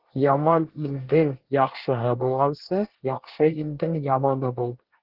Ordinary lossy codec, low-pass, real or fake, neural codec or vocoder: Opus, 16 kbps; 5.4 kHz; fake; codec, 24 kHz, 1 kbps, SNAC